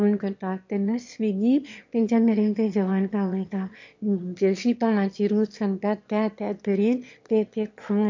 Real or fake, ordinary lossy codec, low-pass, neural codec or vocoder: fake; MP3, 48 kbps; 7.2 kHz; autoencoder, 22.05 kHz, a latent of 192 numbers a frame, VITS, trained on one speaker